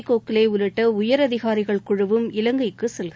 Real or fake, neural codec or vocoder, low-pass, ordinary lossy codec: real; none; none; none